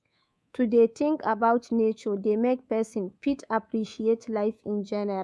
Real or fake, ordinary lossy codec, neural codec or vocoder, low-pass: fake; none; codec, 24 kHz, 3.1 kbps, DualCodec; none